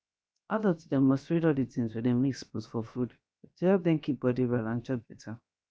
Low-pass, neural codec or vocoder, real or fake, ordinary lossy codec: none; codec, 16 kHz, 0.7 kbps, FocalCodec; fake; none